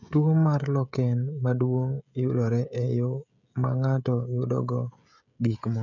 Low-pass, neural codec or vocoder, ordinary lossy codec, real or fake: 7.2 kHz; codec, 16 kHz, 16 kbps, FreqCodec, smaller model; none; fake